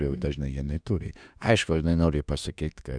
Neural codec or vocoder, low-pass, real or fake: autoencoder, 48 kHz, 32 numbers a frame, DAC-VAE, trained on Japanese speech; 9.9 kHz; fake